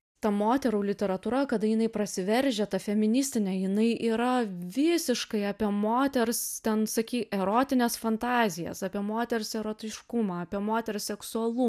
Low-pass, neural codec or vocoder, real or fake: 14.4 kHz; none; real